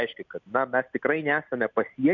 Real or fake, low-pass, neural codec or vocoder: real; 7.2 kHz; none